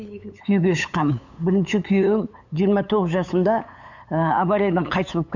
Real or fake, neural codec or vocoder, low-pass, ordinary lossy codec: fake; codec, 16 kHz, 8 kbps, FunCodec, trained on LibriTTS, 25 frames a second; 7.2 kHz; none